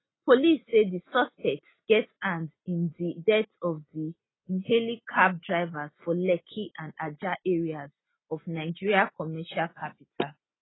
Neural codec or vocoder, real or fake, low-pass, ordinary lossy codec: none; real; 7.2 kHz; AAC, 16 kbps